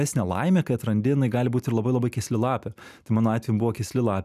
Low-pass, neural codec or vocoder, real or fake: 14.4 kHz; none; real